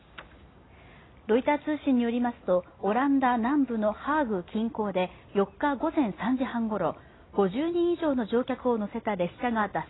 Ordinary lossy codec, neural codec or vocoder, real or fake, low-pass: AAC, 16 kbps; none; real; 7.2 kHz